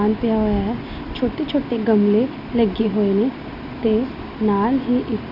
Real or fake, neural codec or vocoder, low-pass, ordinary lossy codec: real; none; 5.4 kHz; none